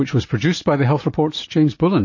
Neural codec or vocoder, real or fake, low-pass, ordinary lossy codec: none; real; 7.2 kHz; MP3, 32 kbps